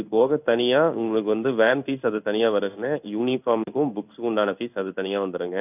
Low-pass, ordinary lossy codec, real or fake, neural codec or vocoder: 3.6 kHz; none; fake; codec, 16 kHz in and 24 kHz out, 1 kbps, XY-Tokenizer